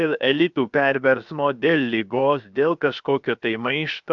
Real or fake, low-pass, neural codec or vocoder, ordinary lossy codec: fake; 7.2 kHz; codec, 16 kHz, 0.7 kbps, FocalCodec; MP3, 96 kbps